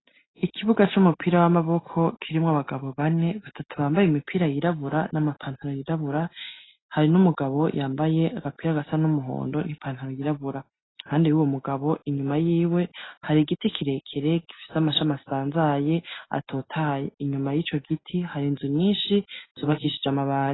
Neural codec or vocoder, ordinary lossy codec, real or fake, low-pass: none; AAC, 16 kbps; real; 7.2 kHz